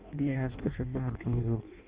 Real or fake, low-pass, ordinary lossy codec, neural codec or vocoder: fake; 3.6 kHz; Opus, 24 kbps; codec, 16 kHz in and 24 kHz out, 0.6 kbps, FireRedTTS-2 codec